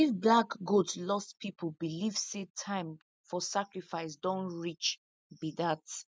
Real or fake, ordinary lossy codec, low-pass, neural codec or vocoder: real; none; none; none